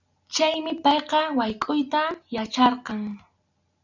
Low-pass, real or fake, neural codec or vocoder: 7.2 kHz; real; none